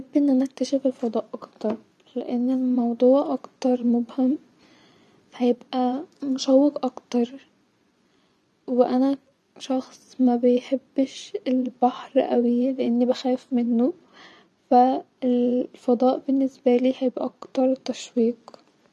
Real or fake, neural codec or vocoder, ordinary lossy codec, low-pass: fake; vocoder, 24 kHz, 100 mel bands, Vocos; none; none